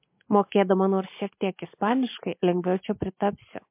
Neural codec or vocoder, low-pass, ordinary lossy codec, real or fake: none; 3.6 kHz; MP3, 24 kbps; real